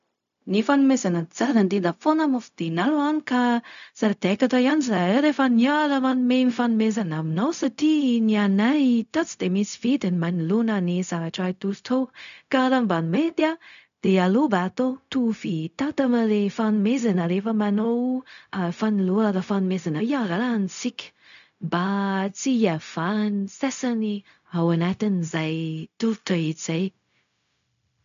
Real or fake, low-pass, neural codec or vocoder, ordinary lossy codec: fake; 7.2 kHz; codec, 16 kHz, 0.4 kbps, LongCat-Audio-Codec; none